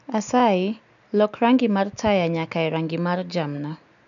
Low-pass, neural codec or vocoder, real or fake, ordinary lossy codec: 7.2 kHz; none; real; none